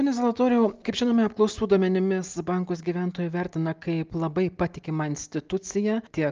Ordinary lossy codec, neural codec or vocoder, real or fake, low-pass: Opus, 24 kbps; none; real; 7.2 kHz